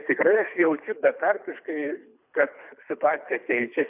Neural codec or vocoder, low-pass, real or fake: codec, 24 kHz, 3 kbps, HILCodec; 3.6 kHz; fake